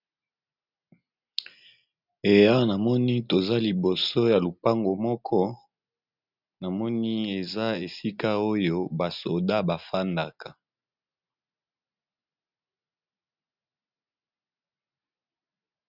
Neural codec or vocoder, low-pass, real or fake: none; 5.4 kHz; real